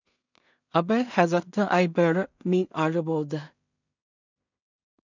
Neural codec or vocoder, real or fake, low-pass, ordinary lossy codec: codec, 16 kHz in and 24 kHz out, 0.4 kbps, LongCat-Audio-Codec, two codebook decoder; fake; 7.2 kHz; none